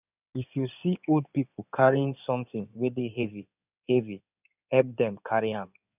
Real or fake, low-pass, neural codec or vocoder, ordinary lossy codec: fake; 3.6 kHz; codec, 16 kHz in and 24 kHz out, 2.2 kbps, FireRedTTS-2 codec; none